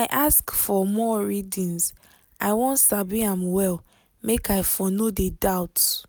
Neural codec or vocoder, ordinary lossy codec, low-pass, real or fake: none; none; none; real